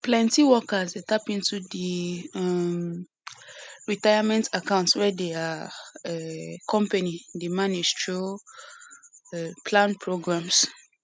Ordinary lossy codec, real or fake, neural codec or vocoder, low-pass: none; real; none; none